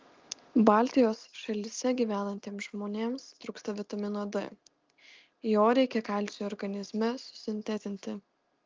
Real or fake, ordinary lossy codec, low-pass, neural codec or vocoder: real; Opus, 24 kbps; 7.2 kHz; none